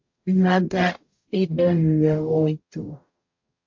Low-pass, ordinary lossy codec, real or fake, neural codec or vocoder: 7.2 kHz; MP3, 48 kbps; fake; codec, 44.1 kHz, 0.9 kbps, DAC